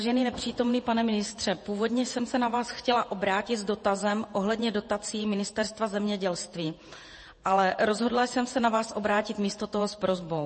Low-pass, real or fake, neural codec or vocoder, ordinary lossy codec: 10.8 kHz; fake; vocoder, 48 kHz, 128 mel bands, Vocos; MP3, 32 kbps